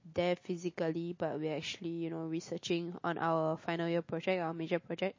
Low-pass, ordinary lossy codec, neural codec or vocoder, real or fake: 7.2 kHz; MP3, 32 kbps; none; real